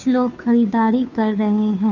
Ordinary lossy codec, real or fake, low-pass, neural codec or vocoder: none; fake; 7.2 kHz; codec, 16 kHz, 2 kbps, FunCodec, trained on Chinese and English, 25 frames a second